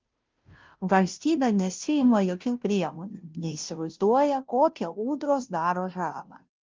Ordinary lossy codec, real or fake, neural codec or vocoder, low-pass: Opus, 24 kbps; fake; codec, 16 kHz, 0.5 kbps, FunCodec, trained on Chinese and English, 25 frames a second; 7.2 kHz